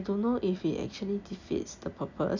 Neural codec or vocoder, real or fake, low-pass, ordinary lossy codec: none; real; 7.2 kHz; none